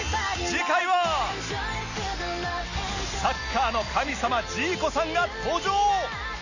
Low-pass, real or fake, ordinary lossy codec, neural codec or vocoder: 7.2 kHz; real; none; none